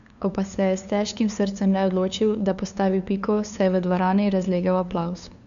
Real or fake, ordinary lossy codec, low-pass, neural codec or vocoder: fake; none; 7.2 kHz; codec, 16 kHz, 2 kbps, FunCodec, trained on LibriTTS, 25 frames a second